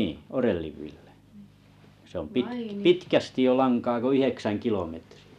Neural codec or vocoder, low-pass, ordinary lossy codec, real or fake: none; 14.4 kHz; none; real